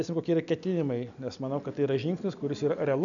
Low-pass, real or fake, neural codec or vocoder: 7.2 kHz; real; none